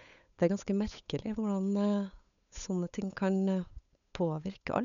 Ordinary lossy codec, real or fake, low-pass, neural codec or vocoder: none; fake; 7.2 kHz; codec, 16 kHz, 8 kbps, FunCodec, trained on Chinese and English, 25 frames a second